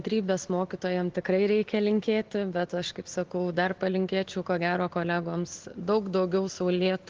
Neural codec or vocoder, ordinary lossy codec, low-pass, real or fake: none; Opus, 16 kbps; 7.2 kHz; real